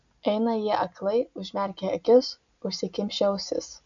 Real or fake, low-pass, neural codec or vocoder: real; 7.2 kHz; none